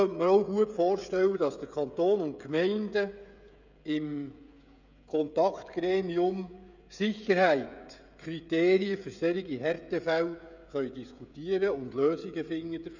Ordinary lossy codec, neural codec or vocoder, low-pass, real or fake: none; codec, 16 kHz, 16 kbps, FreqCodec, smaller model; 7.2 kHz; fake